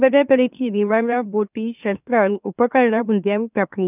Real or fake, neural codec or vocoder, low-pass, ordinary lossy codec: fake; autoencoder, 44.1 kHz, a latent of 192 numbers a frame, MeloTTS; 3.6 kHz; none